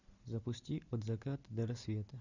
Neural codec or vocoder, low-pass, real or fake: none; 7.2 kHz; real